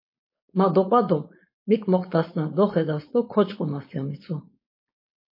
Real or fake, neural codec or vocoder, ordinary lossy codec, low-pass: fake; codec, 16 kHz, 4.8 kbps, FACodec; MP3, 24 kbps; 5.4 kHz